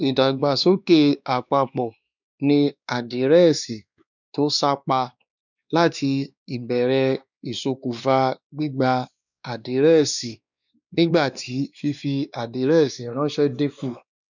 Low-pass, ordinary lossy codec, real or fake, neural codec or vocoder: 7.2 kHz; none; fake; codec, 16 kHz, 2 kbps, X-Codec, WavLM features, trained on Multilingual LibriSpeech